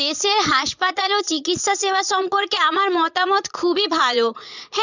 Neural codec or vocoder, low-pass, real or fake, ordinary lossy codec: vocoder, 22.05 kHz, 80 mel bands, Vocos; 7.2 kHz; fake; none